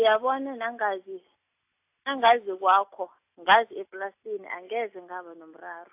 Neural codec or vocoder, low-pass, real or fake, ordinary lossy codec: none; 3.6 kHz; real; AAC, 32 kbps